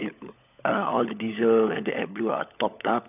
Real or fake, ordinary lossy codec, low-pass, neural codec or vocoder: fake; none; 3.6 kHz; codec, 16 kHz, 16 kbps, FunCodec, trained on LibriTTS, 50 frames a second